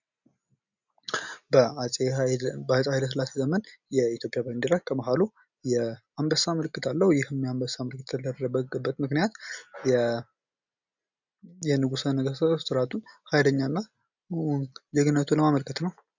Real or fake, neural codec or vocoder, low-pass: real; none; 7.2 kHz